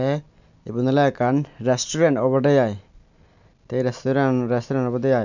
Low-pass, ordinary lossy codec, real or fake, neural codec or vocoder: 7.2 kHz; none; real; none